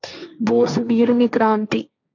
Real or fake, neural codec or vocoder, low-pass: fake; codec, 16 kHz, 1.1 kbps, Voila-Tokenizer; 7.2 kHz